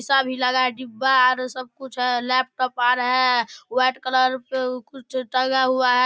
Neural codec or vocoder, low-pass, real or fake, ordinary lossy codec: none; none; real; none